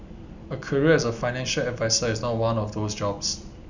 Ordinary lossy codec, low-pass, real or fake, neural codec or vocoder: none; 7.2 kHz; real; none